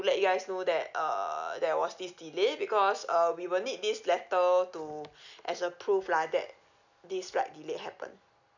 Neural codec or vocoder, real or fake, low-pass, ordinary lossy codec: none; real; 7.2 kHz; none